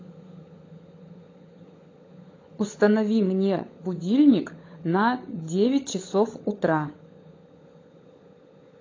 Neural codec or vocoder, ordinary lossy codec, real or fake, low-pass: codec, 16 kHz, 16 kbps, FunCodec, trained on LibriTTS, 50 frames a second; AAC, 32 kbps; fake; 7.2 kHz